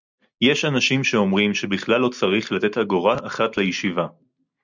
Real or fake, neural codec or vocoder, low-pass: real; none; 7.2 kHz